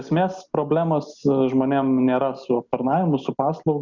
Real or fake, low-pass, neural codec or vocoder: real; 7.2 kHz; none